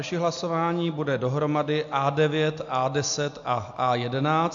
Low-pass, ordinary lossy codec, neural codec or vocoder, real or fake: 7.2 kHz; AAC, 64 kbps; none; real